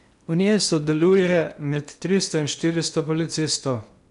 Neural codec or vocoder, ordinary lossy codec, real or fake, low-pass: codec, 16 kHz in and 24 kHz out, 0.8 kbps, FocalCodec, streaming, 65536 codes; none; fake; 10.8 kHz